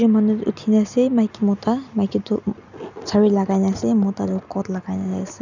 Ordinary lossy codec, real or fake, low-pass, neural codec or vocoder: none; real; 7.2 kHz; none